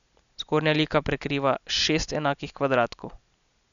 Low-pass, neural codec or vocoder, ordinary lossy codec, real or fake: 7.2 kHz; none; none; real